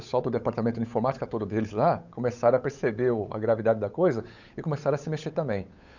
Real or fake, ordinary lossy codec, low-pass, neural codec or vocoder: fake; none; 7.2 kHz; codec, 16 kHz, 8 kbps, FunCodec, trained on Chinese and English, 25 frames a second